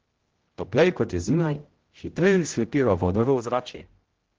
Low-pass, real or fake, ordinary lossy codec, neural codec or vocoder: 7.2 kHz; fake; Opus, 16 kbps; codec, 16 kHz, 0.5 kbps, X-Codec, HuBERT features, trained on general audio